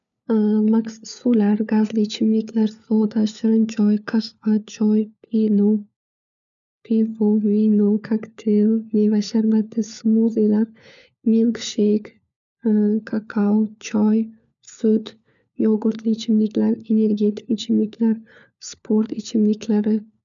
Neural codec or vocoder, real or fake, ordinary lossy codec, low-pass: codec, 16 kHz, 4 kbps, FunCodec, trained on LibriTTS, 50 frames a second; fake; none; 7.2 kHz